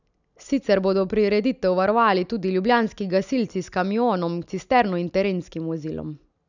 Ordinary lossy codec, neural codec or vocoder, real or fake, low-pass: none; none; real; 7.2 kHz